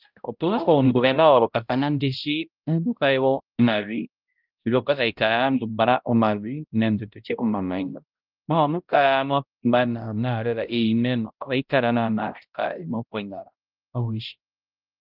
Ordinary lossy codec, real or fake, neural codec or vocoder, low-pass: Opus, 32 kbps; fake; codec, 16 kHz, 0.5 kbps, X-Codec, HuBERT features, trained on balanced general audio; 5.4 kHz